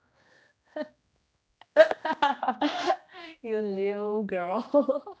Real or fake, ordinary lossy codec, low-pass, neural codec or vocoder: fake; none; none; codec, 16 kHz, 1 kbps, X-Codec, HuBERT features, trained on general audio